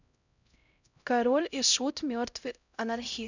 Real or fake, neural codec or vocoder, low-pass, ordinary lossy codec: fake; codec, 16 kHz, 0.5 kbps, X-Codec, HuBERT features, trained on LibriSpeech; 7.2 kHz; none